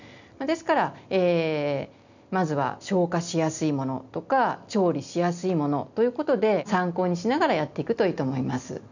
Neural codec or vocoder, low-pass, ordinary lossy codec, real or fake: none; 7.2 kHz; none; real